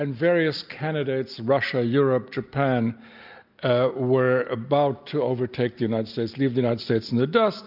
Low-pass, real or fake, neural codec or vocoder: 5.4 kHz; real; none